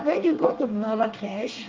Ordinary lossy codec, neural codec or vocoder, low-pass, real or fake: Opus, 24 kbps; codec, 24 kHz, 1 kbps, SNAC; 7.2 kHz; fake